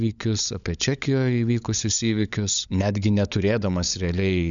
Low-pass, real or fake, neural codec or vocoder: 7.2 kHz; fake; codec, 16 kHz, 16 kbps, FunCodec, trained on LibriTTS, 50 frames a second